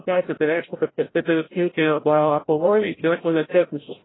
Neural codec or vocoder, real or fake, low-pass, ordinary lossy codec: codec, 16 kHz, 0.5 kbps, FreqCodec, larger model; fake; 7.2 kHz; AAC, 16 kbps